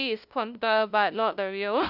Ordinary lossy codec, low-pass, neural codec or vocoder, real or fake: none; 5.4 kHz; codec, 16 kHz, 0.5 kbps, FunCodec, trained on LibriTTS, 25 frames a second; fake